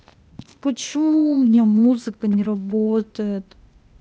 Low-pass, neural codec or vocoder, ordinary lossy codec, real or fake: none; codec, 16 kHz, 0.8 kbps, ZipCodec; none; fake